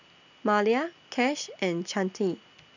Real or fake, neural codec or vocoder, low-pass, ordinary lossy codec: real; none; 7.2 kHz; none